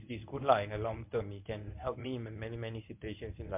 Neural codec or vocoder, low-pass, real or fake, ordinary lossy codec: codec, 24 kHz, 0.9 kbps, WavTokenizer, medium speech release version 1; 3.6 kHz; fake; MP3, 32 kbps